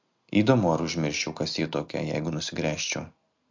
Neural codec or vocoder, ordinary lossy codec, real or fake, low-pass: none; MP3, 64 kbps; real; 7.2 kHz